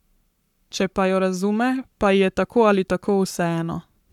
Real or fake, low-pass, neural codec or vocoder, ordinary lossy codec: fake; 19.8 kHz; codec, 44.1 kHz, 7.8 kbps, Pupu-Codec; none